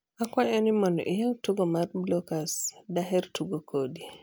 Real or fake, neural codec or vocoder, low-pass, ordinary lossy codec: real; none; none; none